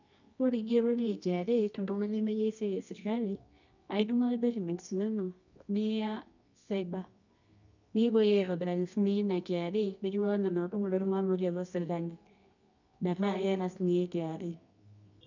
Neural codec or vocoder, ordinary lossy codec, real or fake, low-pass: codec, 24 kHz, 0.9 kbps, WavTokenizer, medium music audio release; none; fake; 7.2 kHz